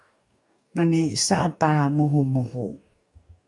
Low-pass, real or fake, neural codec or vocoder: 10.8 kHz; fake; codec, 44.1 kHz, 2.6 kbps, DAC